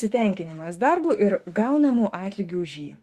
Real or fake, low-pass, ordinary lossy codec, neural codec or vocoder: fake; 14.4 kHz; Opus, 64 kbps; autoencoder, 48 kHz, 32 numbers a frame, DAC-VAE, trained on Japanese speech